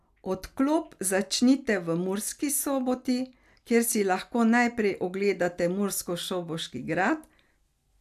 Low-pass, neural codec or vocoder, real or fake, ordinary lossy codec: 14.4 kHz; none; real; none